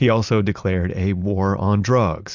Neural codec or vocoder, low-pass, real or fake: none; 7.2 kHz; real